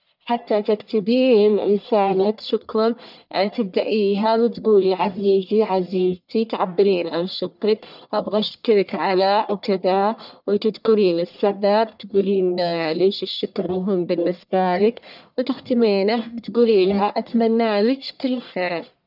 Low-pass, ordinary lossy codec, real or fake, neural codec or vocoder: 5.4 kHz; none; fake; codec, 44.1 kHz, 1.7 kbps, Pupu-Codec